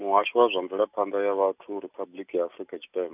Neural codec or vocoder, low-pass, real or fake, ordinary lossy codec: none; 3.6 kHz; real; none